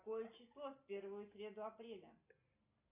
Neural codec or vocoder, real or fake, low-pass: none; real; 3.6 kHz